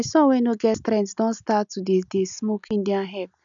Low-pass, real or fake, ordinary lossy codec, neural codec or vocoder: 7.2 kHz; real; none; none